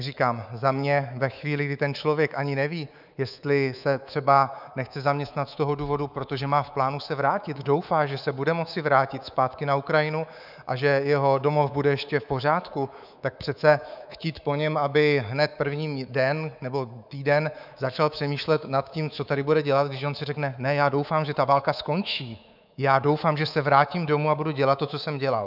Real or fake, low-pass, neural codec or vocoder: fake; 5.4 kHz; codec, 24 kHz, 3.1 kbps, DualCodec